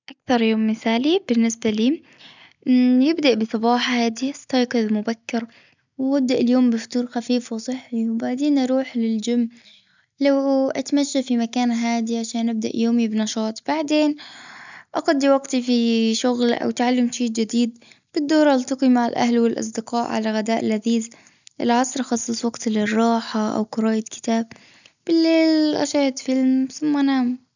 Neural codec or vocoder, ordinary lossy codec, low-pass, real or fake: none; none; 7.2 kHz; real